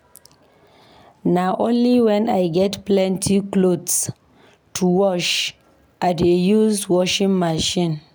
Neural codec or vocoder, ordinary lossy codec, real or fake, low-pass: none; none; real; none